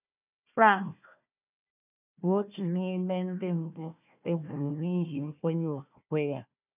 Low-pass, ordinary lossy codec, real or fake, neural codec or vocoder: 3.6 kHz; none; fake; codec, 16 kHz, 1 kbps, FunCodec, trained on Chinese and English, 50 frames a second